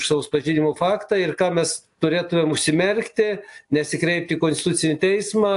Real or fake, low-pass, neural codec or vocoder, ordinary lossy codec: real; 10.8 kHz; none; AAC, 64 kbps